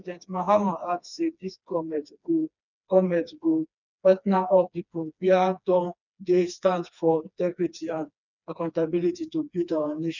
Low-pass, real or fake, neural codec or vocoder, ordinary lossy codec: 7.2 kHz; fake; codec, 16 kHz, 2 kbps, FreqCodec, smaller model; none